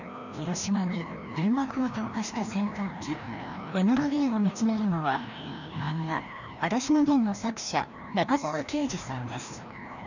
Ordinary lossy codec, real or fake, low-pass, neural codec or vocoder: none; fake; 7.2 kHz; codec, 16 kHz, 1 kbps, FreqCodec, larger model